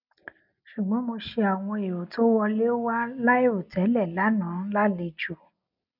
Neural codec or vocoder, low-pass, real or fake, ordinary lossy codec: none; 5.4 kHz; real; none